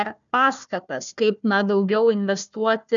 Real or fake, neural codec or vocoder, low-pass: fake; codec, 16 kHz, 1 kbps, FunCodec, trained on Chinese and English, 50 frames a second; 7.2 kHz